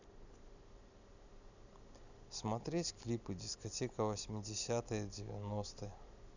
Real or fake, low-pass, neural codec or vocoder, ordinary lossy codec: real; 7.2 kHz; none; none